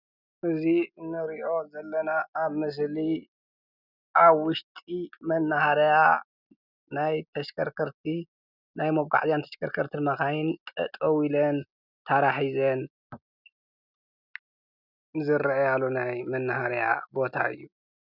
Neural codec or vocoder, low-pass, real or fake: none; 5.4 kHz; real